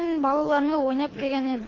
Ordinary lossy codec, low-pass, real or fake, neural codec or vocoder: AAC, 32 kbps; 7.2 kHz; fake; codec, 24 kHz, 3 kbps, HILCodec